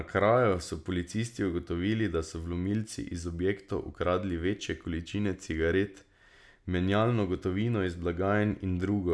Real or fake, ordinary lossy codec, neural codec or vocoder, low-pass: real; none; none; none